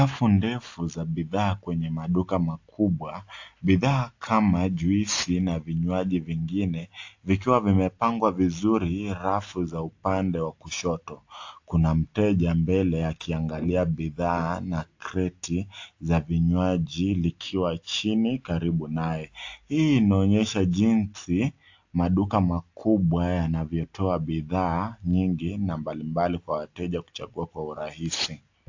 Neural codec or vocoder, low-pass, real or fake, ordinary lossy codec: none; 7.2 kHz; real; AAC, 48 kbps